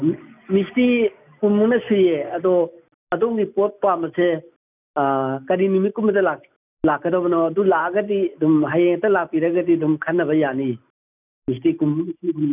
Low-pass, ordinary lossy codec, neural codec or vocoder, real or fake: 3.6 kHz; none; none; real